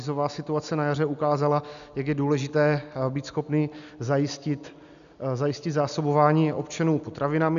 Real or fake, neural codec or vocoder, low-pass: real; none; 7.2 kHz